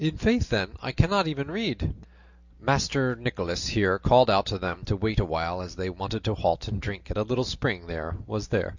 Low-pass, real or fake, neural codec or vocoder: 7.2 kHz; real; none